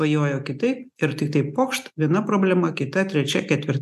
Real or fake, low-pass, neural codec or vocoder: real; 14.4 kHz; none